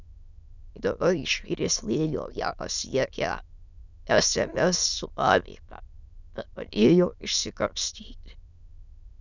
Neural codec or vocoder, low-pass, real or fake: autoencoder, 22.05 kHz, a latent of 192 numbers a frame, VITS, trained on many speakers; 7.2 kHz; fake